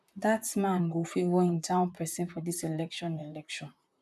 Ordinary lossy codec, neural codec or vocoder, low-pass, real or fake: none; vocoder, 44.1 kHz, 128 mel bands, Pupu-Vocoder; 14.4 kHz; fake